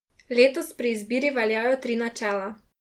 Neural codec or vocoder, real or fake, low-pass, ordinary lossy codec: none; real; 19.8 kHz; Opus, 24 kbps